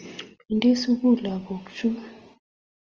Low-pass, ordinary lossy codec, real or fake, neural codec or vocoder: 7.2 kHz; Opus, 24 kbps; real; none